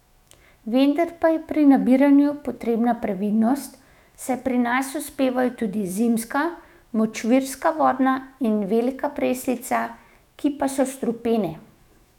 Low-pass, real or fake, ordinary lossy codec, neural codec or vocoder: 19.8 kHz; fake; none; autoencoder, 48 kHz, 128 numbers a frame, DAC-VAE, trained on Japanese speech